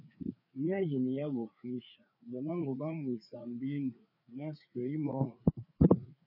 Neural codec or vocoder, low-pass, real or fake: codec, 16 kHz, 4 kbps, FreqCodec, larger model; 5.4 kHz; fake